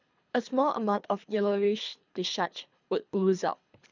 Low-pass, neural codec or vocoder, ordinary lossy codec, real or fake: 7.2 kHz; codec, 24 kHz, 3 kbps, HILCodec; none; fake